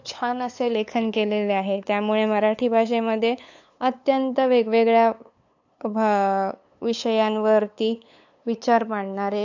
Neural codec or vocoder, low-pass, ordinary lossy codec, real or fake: codec, 16 kHz, 8 kbps, FunCodec, trained on LibriTTS, 25 frames a second; 7.2 kHz; AAC, 48 kbps; fake